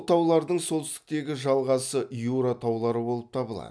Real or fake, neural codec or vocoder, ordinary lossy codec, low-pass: real; none; none; none